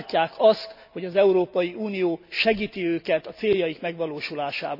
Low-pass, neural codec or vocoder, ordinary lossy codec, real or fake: 5.4 kHz; none; none; real